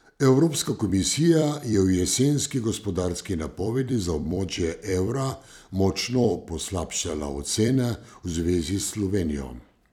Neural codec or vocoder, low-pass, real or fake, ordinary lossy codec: vocoder, 44.1 kHz, 128 mel bands every 256 samples, BigVGAN v2; 19.8 kHz; fake; none